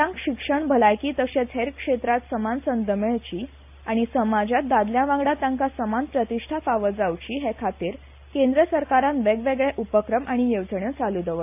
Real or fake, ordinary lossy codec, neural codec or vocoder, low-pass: real; AAC, 32 kbps; none; 3.6 kHz